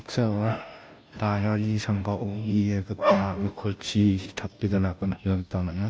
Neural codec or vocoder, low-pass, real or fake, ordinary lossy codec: codec, 16 kHz, 0.5 kbps, FunCodec, trained on Chinese and English, 25 frames a second; none; fake; none